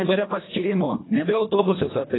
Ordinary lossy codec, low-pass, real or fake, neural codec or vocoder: AAC, 16 kbps; 7.2 kHz; fake; codec, 24 kHz, 1.5 kbps, HILCodec